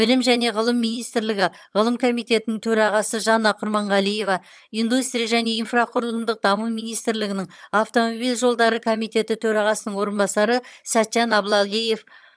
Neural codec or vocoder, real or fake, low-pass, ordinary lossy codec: vocoder, 22.05 kHz, 80 mel bands, HiFi-GAN; fake; none; none